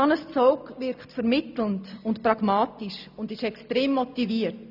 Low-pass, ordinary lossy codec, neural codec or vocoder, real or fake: 5.4 kHz; none; none; real